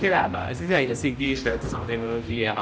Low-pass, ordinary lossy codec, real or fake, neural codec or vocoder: none; none; fake; codec, 16 kHz, 0.5 kbps, X-Codec, HuBERT features, trained on general audio